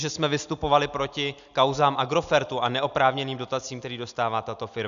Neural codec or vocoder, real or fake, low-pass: none; real; 7.2 kHz